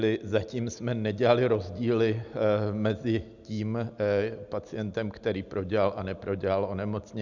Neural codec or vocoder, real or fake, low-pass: none; real; 7.2 kHz